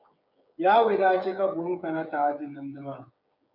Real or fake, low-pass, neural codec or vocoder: fake; 5.4 kHz; codec, 16 kHz, 16 kbps, FreqCodec, smaller model